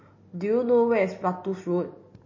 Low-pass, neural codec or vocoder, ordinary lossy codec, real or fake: 7.2 kHz; none; MP3, 32 kbps; real